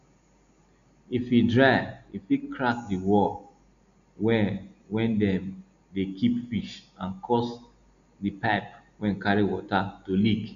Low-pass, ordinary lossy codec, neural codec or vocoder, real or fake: 7.2 kHz; none; none; real